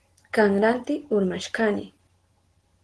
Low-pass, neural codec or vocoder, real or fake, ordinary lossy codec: 10.8 kHz; none; real; Opus, 16 kbps